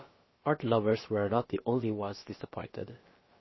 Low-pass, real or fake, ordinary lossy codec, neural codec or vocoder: 7.2 kHz; fake; MP3, 24 kbps; codec, 16 kHz, about 1 kbps, DyCAST, with the encoder's durations